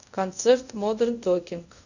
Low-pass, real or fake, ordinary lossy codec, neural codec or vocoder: 7.2 kHz; fake; none; codec, 24 kHz, 0.5 kbps, DualCodec